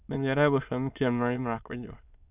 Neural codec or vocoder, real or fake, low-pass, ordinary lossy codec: autoencoder, 22.05 kHz, a latent of 192 numbers a frame, VITS, trained on many speakers; fake; 3.6 kHz; none